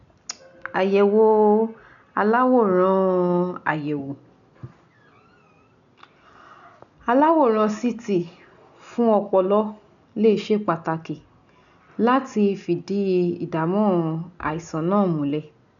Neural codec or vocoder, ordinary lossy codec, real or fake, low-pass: none; none; real; 7.2 kHz